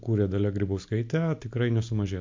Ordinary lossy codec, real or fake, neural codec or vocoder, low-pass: MP3, 48 kbps; real; none; 7.2 kHz